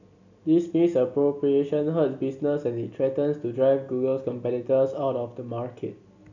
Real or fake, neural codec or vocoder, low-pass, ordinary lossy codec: real; none; 7.2 kHz; none